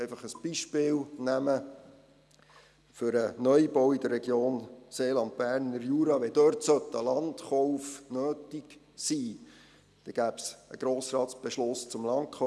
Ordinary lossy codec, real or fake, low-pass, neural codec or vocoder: none; real; none; none